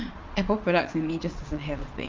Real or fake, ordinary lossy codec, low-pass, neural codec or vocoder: fake; Opus, 32 kbps; 7.2 kHz; vocoder, 22.05 kHz, 80 mel bands, Vocos